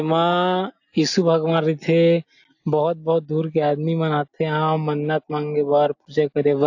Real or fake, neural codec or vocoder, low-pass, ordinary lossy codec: real; none; 7.2 kHz; AAC, 48 kbps